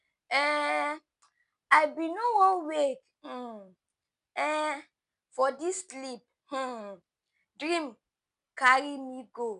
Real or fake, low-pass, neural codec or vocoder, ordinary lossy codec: real; 10.8 kHz; none; none